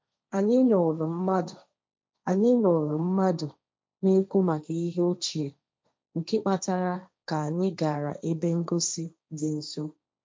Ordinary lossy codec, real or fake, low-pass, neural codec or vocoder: none; fake; none; codec, 16 kHz, 1.1 kbps, Voila-Tokenizer